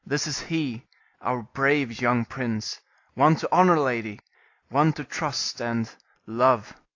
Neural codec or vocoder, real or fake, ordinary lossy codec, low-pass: none; real; AAC, 48 kbps; 7.2 kHz